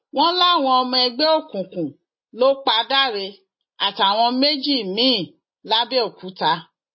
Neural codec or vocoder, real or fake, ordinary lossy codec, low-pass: none; real; MP3, 24 kbps; 7.2 kHz